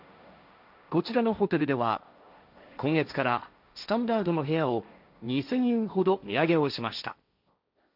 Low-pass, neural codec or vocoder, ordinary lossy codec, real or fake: 5.4 kHz; codec, 16 kHz, 1.1 kbps, Voila-Tokenizer; none; fake